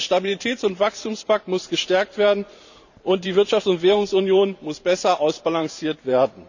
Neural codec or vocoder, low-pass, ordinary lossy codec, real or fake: vocoder, 44.1 kHz, 128 mel bands every 512 samples, BigVGAN v2; 7.2 kHz; none; fake